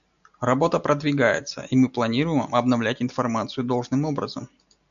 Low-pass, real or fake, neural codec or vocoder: 7.2 kHz; real; none